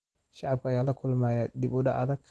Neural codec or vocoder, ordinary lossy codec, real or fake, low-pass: none; Opus, 16 kbps; real; 10.8 kHz